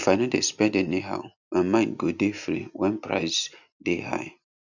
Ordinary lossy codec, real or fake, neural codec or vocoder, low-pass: none; real; none; 7.2 kHz